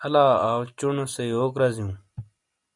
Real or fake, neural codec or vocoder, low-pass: real; none; 10.8 kHz